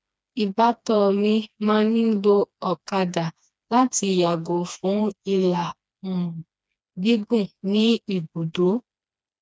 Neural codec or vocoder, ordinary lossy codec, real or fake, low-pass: codec, 16 kHz, 2 kbps, FreqCodec, smaller model; none; fake; none